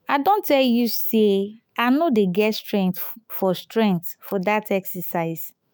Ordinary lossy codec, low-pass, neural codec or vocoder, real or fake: none; none; autoencoder, 48 kHz, 128 numbers a frame, DAC-VAE, trained on Japanese speech; fake